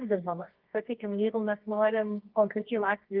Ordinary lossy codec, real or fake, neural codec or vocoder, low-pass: Opus, 32 kbps; fake; codec, 24 kHz, 0.9 kbps, WavTokenizer, medium music audio release; 5.4 kHz